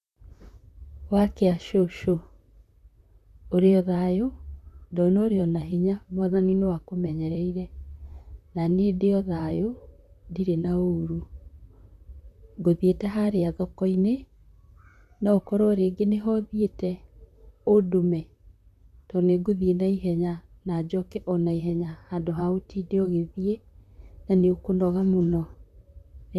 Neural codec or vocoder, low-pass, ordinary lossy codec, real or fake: vocoder, 44.1 kHz, 128 mel bands, Pupu-Vocoder; 14.4 kHz; none; fake